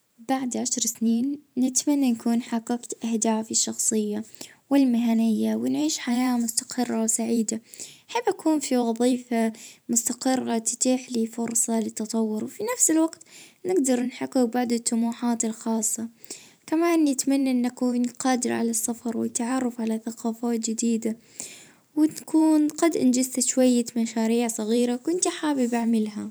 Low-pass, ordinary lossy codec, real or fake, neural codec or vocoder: none; none; fake; vocoder, 44.1 kHz, 128 mel bands every 512 samples, BigVGAN v2